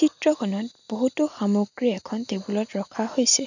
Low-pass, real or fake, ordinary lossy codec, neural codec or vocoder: 7.2 kHz; real; none; none